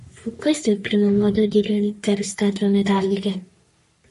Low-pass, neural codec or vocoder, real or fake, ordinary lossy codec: 14.4 kHz; codec, 44.1 kHz, 3.4 kbps, Pupu-Codec; fake; MP3, 48 kbps